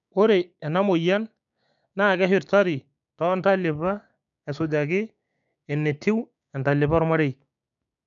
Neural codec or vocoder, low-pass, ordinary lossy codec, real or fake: codec, 16 kHz, 6 kbps, DAC; 7.2 kHz; none; fake